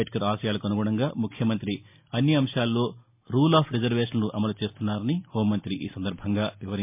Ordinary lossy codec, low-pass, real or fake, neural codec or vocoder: MP3, 32 kbps; 3.6 kHz; real; none